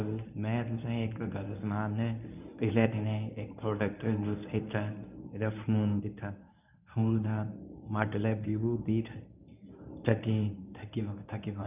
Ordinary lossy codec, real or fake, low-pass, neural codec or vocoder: none; fake; 3.6 kHz; codec, 24 kHz, 0.9 kbps, WavTokenizer, medium speech release version 1